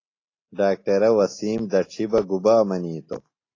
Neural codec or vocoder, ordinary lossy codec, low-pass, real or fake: none; AAC, 32 kbps; 7.2 kHz; real